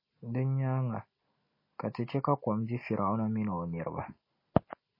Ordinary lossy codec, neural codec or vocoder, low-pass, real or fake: MP3, 24 kbps; none; 5.4 kHz; real